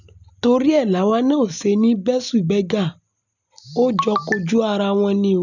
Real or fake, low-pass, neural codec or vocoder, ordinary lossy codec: real; 7.2 kHz; none; none